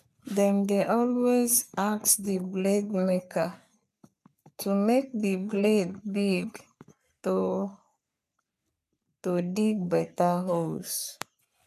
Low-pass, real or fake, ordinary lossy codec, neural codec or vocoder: 14.4 kHz; fake; none; codec, 44.1 kHz, 3.4 kbps, Pupu-Codec